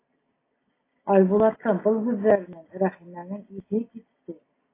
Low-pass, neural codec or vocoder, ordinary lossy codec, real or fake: 3.6 kHz; none; AAC, 24 kbps; real